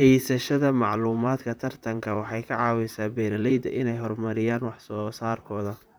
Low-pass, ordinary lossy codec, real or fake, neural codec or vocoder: none; none; fake; vocoder, 44.1 kHz, 128 mel bands, Pupu-Vocoder